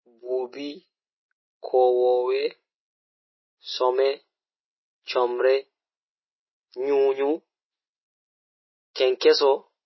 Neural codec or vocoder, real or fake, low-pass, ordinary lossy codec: none; real; 7.2 kHz; MP3, 24 kbps